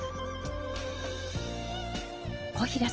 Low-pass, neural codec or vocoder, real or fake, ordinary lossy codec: 7.2 kHz; none; real; Opus, 16 kbps